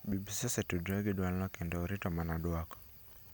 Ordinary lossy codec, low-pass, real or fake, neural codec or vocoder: none; none; real; none